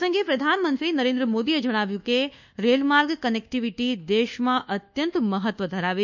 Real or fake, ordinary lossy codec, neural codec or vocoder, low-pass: fake; none; codec, 24 kHz, 1.2 kbps, DualCodec; 7.2 kHz